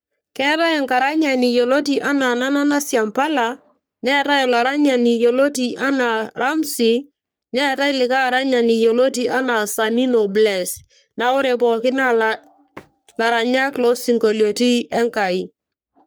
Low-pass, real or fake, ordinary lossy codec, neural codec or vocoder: none; fake; none; codec, 44.1 kHz, 3.4 kbps, Pupu-Codec